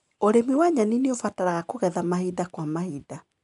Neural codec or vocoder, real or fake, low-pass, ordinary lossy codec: none; real; 10.8 kHz; MP3, 64 kbps